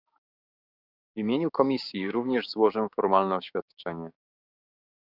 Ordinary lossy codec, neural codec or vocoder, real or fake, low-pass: Opus, 64 kbps; codec, 16 kHz, 6 kbps, DAC; fake; 5.4 kHz